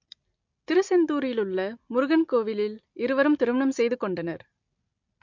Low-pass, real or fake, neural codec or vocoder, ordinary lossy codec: 7.2 kHz; real; none; MP3, 48 kbps